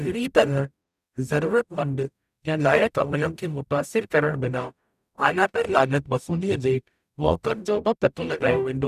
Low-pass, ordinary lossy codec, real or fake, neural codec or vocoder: 14.4 kHz; none; fake; codec, 44.1 kHz, 0.9 kbps, DAC